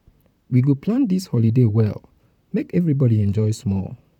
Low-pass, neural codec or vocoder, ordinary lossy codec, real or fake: 19.8 kHz; vocoder, 44.1 kHz, 128 mel bands, Pupu-Vocoder; none; fake